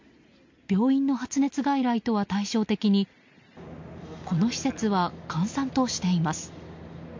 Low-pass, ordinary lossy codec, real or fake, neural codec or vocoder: 7.2 kHz; MP3, 48 kbps; real; none